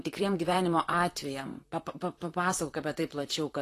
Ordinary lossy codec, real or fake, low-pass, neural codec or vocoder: AAC, 48 kbps; fake; 14.4 kHz; vocoder, 44.1 kHz, 128 mel bands, Pupu-Vocoder